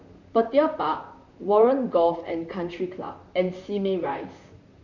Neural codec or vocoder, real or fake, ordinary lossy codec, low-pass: vocoder, 44.1 kHz, 128 mel bands, Pupu-Vocoder; fake; none; 7.2 kHz